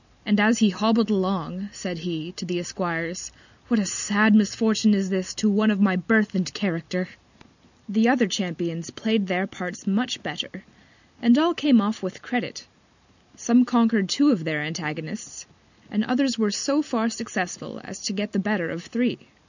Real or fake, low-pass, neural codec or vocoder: real; 7.2 kHz; none